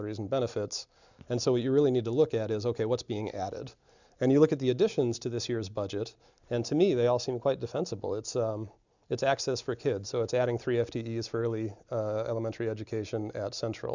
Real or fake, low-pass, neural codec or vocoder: real; 7.2 kHz; none